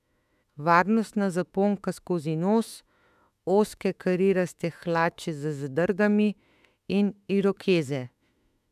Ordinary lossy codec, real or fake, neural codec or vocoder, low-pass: none; fake; autoencoder, 48 kHz, 32 numbers a frame, DAC-VAE, trained on Japanese speech; 14.4 kHz